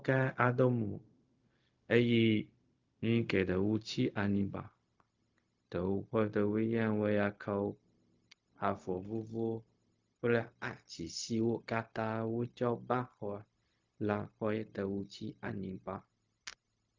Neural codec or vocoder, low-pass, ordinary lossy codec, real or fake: codec, 16 kHz, 0.4 kbps, LongCat-Audio-Codec; 7.2 kHz; Opus, 24 kbps; fake